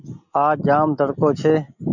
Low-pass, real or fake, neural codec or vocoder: 7.2 kHz; real; none